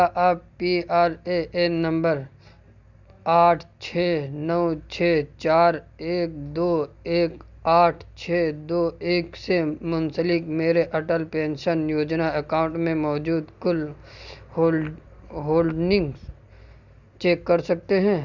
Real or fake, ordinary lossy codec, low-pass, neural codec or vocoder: real; Opus, 64 kbps; 7.2 kHz; none